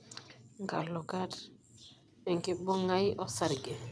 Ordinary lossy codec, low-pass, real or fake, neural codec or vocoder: none; none; fake; vocoder, 22.05 kHz, 80 mel bands, WaveNeXt